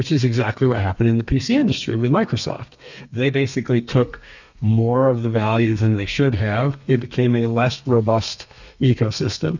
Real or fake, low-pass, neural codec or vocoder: fake; 7.2 kHz; codec, 32 kHz, 1.9 kbps, SNAC